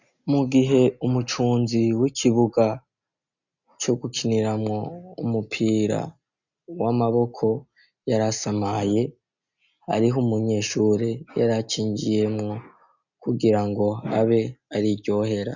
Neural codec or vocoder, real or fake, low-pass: none; real; 7.2 kHz